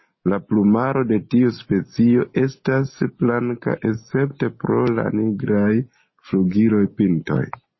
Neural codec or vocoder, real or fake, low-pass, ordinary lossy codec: none; real; 7.2 kHz; MP3, 24 kbps